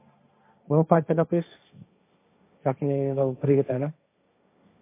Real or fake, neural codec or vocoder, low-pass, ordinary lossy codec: fake; codec, 16 kHz, 1.1 kbps, Voila-Tokenizer; 3.6 kHz; MP3, 24 kbps